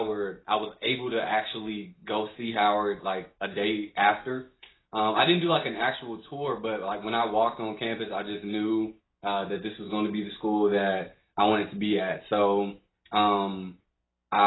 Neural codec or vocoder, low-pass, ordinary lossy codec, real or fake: none; 7.2 kHz; AAC, 16 kbps; real